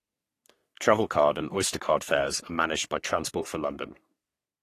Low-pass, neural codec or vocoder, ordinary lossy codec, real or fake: 14.4 kHz; codec, 44.1 kHz, 3.4 kbps, Pupu-Codec; AAC, 48 kbps; fake